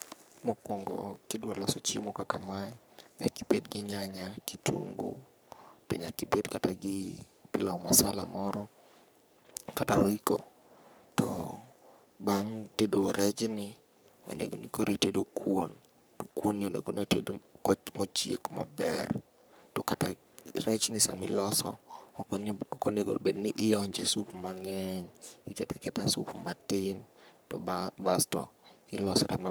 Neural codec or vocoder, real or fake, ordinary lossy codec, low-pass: codec, 44.1 kHz, 3.4 kbps, Pupu-Codec; fake; none; none